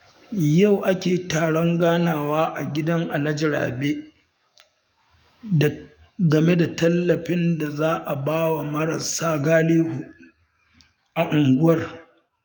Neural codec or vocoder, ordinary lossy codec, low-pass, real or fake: codec, 44.1 kHz, 7.8 kbps, DAC; none; 19.8 kHz; fake